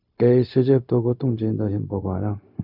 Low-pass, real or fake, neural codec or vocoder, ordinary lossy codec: 5.4 kHz; fake; codec, 16 kHz, 0.4 kbps, LongCat-Audio-Codec; none